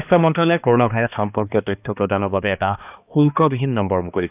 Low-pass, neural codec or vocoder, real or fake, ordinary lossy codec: 3.6 kHz; codec, 16 kHz, 2 kbps, X-Codec, HuBERT features, trained on balanced general audio; fake; none